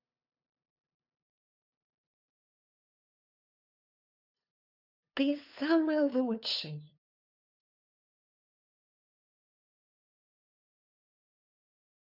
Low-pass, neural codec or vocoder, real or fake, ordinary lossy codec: 5.4 kHz; codec, 16 kHz, 2 kbps, FunCodec, trained on LibriTTS, 25 frames a second; fake; none